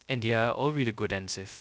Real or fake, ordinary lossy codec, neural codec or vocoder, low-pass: fake; none; codec, 16 kHz, 0.2 kbps, FocalCodec; none